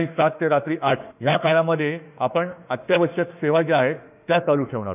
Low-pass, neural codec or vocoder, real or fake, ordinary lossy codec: 3.6 kHz; autoencoder, 48 kHz, 32 numbers a frame, DAC-VAE, trained on Japanese speech; fake; none